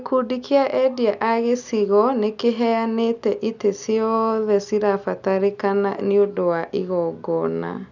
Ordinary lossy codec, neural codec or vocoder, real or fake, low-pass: none; none; real; 7.2 kHz